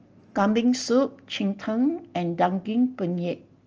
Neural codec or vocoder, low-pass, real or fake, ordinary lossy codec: none; 7.2 kHz; real; Opus, 24 kbps